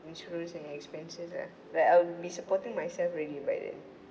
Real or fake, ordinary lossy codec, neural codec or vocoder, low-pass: real; none; none; none